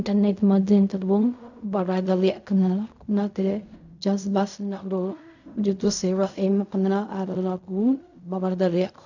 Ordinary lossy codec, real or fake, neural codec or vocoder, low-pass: none; fake; codec, 16 kHz in and 24 kHz out, 0.4 kbps, LongCat-Audio-Codec, fine tuned four codebook decoder; 7.2 kHz